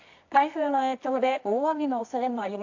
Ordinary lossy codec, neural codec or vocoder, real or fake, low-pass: AAC, 48 kbps; codec, 24 kHz, 0.9 kbps, WavTokenizer, medium music audio release; fake; 7.2 kHz